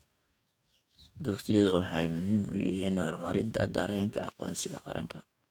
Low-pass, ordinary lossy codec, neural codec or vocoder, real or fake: 19.8 kHz; none; codec, 44.1 kHz, 2.6 kbps, DAC; fake